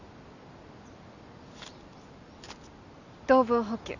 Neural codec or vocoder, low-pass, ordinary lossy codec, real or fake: none; 7.2 kHz; none; real